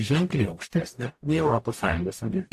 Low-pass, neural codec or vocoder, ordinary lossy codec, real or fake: 14.4 kHz; codec, 44.1 kHz, 0.9 kbps, DAC; AAC, 64 kbps; fake